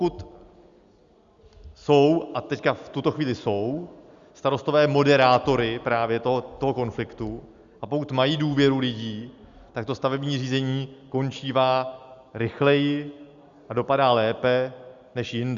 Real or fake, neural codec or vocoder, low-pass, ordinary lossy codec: real; none; 7.2 kHz; Opus, 64 kbps